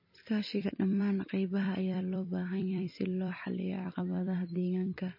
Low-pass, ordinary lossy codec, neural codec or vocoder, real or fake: 5.4 kHz; MP3, 24 kbps; vocoder, 44.1 kHz, 128 mel bands every 256 samples, BigVGAN v2; fake